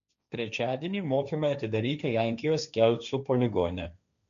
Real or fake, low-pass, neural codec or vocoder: fake; 7.2 kHz; codec, 16 kHz, 1.1 kbps, Voila-Tokenizer